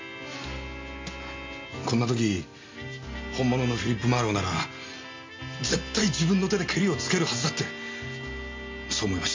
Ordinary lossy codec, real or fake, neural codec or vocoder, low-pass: MP3, 48 kbps; real; none; 7.2 kHz